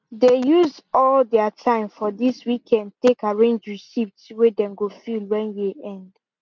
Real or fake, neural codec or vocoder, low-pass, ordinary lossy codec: real; none; 7.2 kHz; none